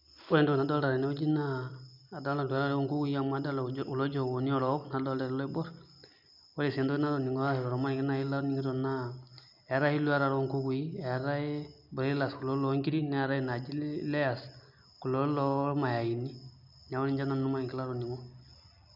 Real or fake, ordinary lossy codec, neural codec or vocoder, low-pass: real; none; none; 5.4 kHz